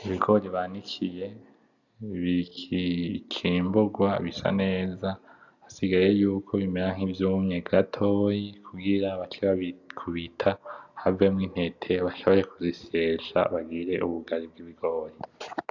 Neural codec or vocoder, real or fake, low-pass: none; real; 7.2 kHz